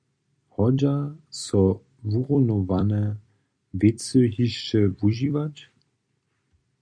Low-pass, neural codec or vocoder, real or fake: 9.9 kHz; vocoder, 44.1 kHz, 128 mel bands every 256 samples, BigVGAN v2; fake